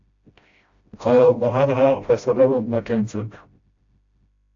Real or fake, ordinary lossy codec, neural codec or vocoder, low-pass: fake; AAC, 48 kbps; codec, 16 kHz, 0.5 kbps, FreqCodec, smaller model; 7.2 kHz